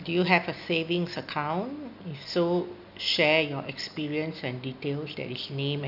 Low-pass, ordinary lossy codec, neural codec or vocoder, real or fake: 5.4 kHz; none; none; real